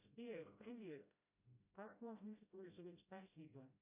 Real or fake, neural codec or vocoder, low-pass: fake; codec, 16 kHz, 0.5 kbps, FreqCodec, smaller model; 3.6 kHz